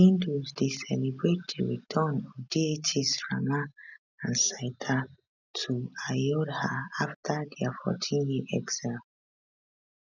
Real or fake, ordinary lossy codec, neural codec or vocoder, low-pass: real; none; none; 7.2 kHz